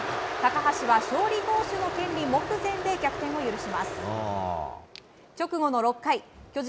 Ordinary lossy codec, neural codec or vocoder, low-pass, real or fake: none; none; none; real